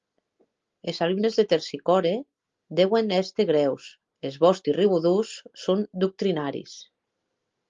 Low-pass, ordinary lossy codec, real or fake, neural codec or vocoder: 7.2 kHz; Opus, 32 kbps; real; none